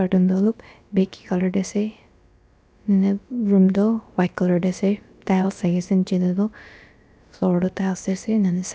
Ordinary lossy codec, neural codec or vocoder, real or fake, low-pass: none; codec, 16 kHz, about 1 kbps, DyCAST, with the encoder's durations; fake; none